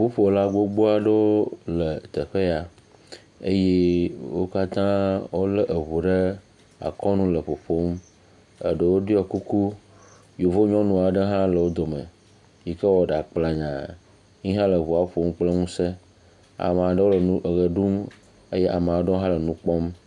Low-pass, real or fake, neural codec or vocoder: 10.8 kHz; real; none